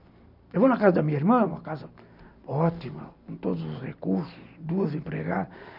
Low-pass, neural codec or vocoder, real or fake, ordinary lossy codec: 5.4 kHz; none; real; none